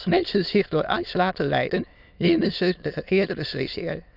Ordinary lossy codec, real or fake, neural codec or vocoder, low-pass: none; fake; autoencoder, 22.05 kHz, a latent of 192 numbers a frame, VITS, trained on many speakers; 5.4 kHz